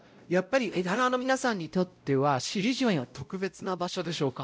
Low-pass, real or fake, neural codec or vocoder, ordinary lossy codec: none; fake; codec, 16 kHz, 0.5 kbps, X-Codec, WavLM features, trained on Multilingual LibriSpeech; none